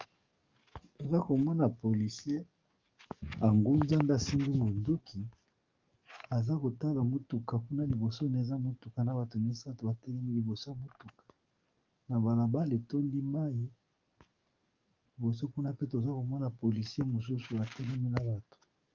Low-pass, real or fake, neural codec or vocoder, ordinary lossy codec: 7.2 kHz; fake; codec, 44.1 kHz, 7.8 kbps, DAC; Opus, 32 kbps